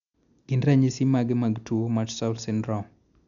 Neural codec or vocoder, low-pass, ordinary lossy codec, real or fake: none; 7.2 kHz; none; real